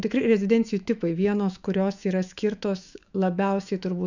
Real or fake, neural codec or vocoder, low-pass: fake; autoencoder, 48 kHz, 128 numbers a frame, DAC-VAE, trained on Japanese speech; 7.2 kHz